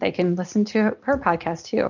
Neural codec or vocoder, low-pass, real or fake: none; 7.2 kHz; real